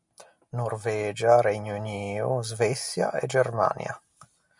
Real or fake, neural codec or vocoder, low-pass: real; none; 10.8 kHz